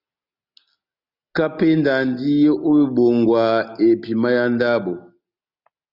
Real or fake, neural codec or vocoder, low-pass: real; none; 5.4 kHz